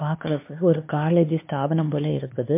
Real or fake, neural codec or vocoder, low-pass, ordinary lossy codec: fake; codec, 16 kHz, 2 kbps, X-Codec, HuBERT features, trained on LibriSpeech; 3.6 kHz; MP3, 24 kbps